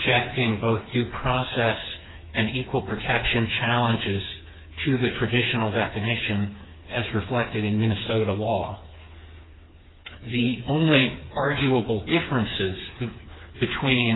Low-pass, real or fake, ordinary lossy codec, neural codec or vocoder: 7.2 kHz; fake; AAC, 16 kbps; codec, 16 kHz, 4 kbps, FreqCodec, smaller model